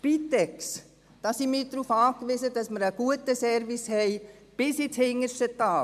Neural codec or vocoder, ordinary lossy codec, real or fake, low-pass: none; MP3, 96 kbps; real; 14.4 kHz